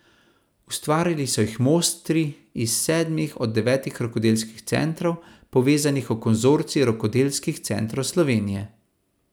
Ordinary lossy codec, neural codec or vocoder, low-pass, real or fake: none; none; none; real